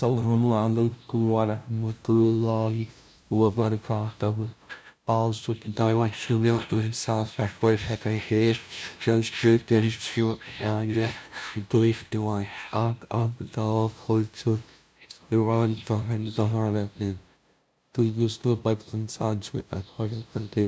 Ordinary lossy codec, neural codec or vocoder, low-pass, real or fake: none; codec, 16 kHz, 0.5 kbps, FunCodec, trained on LibriTTS, 25 frames a second; none; fake